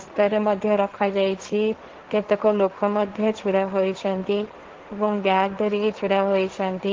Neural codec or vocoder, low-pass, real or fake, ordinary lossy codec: codec, 16 kHz, 1.1 kbps, Voila-Tokenizer; 7.2 kHz; fake; Opus, 16 kbps